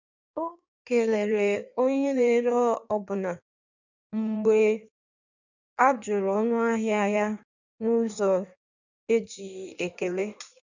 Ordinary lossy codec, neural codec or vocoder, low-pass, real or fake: none; codec, 16 kHz in and 24 kHz out, 1.1 kbps, FireRedTTS-2 codec; 7.2 kHz; fake